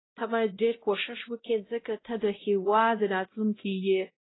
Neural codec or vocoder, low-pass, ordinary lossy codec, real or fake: codec, 16 kHz, 0.5 kbps, X-Codec, WavLM features, trained on Multilingual LibriSpeech; 7.2 kHz; AAC, 16 kbps; fake